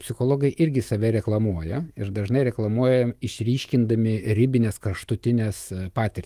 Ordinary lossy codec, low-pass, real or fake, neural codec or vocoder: Opus, 32 kbps; 14.4 kHz; fake; autoencoder, 48 kHz, 128 numbers a frame, DAC-VAE, trained on Japanese speech